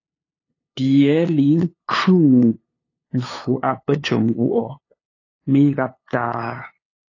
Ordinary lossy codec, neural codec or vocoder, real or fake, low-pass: AAC, 32 kbps; codec, 16 kHz, 2 kbps, FunCodec, trained on LibriTTS, 25 frames a second; fake; 7.2 kHz